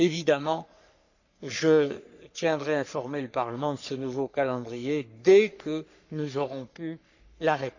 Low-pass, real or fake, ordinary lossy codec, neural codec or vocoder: 7.2 kHz; fake; none; codec, 44.1 kHz, 3.4 kbps, Pupu-Codec